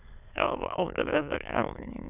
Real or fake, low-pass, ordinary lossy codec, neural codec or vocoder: fake; 3.6 kHz; none; autoencoder, 22.05 kHz, a latent of 192 numbers a frame, VITS, trained on many speakers